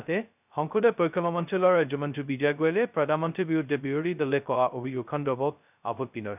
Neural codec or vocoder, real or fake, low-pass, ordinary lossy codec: codec, 16 kHz, 0.2 kbps, FocalCodec; fake; 3.6 kHz; none